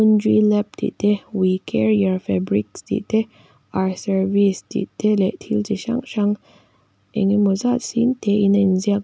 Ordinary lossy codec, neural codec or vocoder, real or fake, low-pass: none; none; real; none